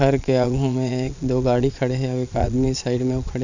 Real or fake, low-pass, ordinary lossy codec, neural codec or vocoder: fake; 7.2 kHz; none; vocoder, 22.05 kHz, 80 mel bands, Vocos